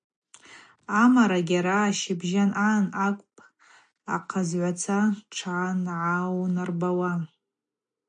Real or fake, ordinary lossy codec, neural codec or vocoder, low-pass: real; MP3, 48 kbps; none; 9.9 kHz